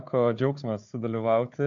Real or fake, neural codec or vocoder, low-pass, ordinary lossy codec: fake; codec, 16 kHz, 4 kbps, FunCodec, trained on Chinese and English, 50 frames a second; 7.2 kHz; AAC, 64 kbps